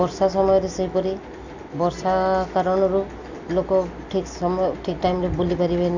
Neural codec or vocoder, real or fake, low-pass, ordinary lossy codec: none; real; 7.2 kHz; none